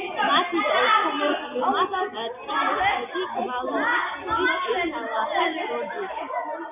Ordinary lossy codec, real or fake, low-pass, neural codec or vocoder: AAC, 32 kbps; real; 3.6 kHz; none